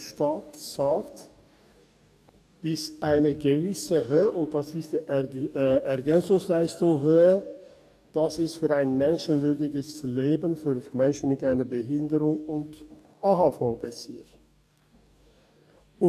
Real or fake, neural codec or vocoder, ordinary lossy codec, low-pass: fake; codec, 44.1 kHz, 2.6 kbps, DAC; none; 14.4 kHz